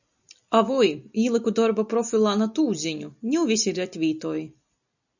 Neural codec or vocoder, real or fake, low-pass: none; real; 7.2 kHz